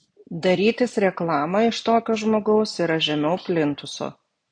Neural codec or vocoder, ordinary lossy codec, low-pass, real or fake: none; AAC, 64 kbps; 9.9 kHz; real